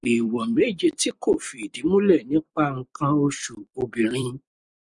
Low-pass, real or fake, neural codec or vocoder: 10.8 kHz; fake; vocoder, 44.1 kHz, 128 mel bands every 256 samples, BigVGAN v2